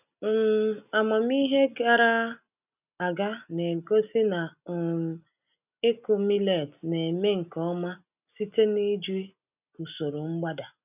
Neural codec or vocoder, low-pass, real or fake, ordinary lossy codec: none; 3.6 kHz; real; none